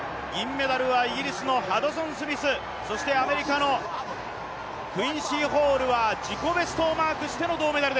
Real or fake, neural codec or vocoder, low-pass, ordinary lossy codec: real; none; none; none